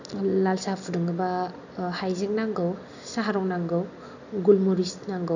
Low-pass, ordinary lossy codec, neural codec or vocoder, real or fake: 7.2 kHz; none; none; real